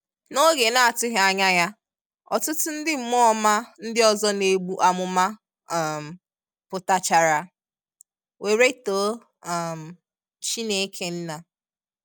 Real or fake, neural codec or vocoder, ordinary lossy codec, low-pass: real; none; none; none